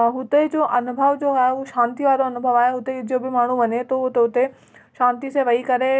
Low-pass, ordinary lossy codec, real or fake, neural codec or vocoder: none; none; real; none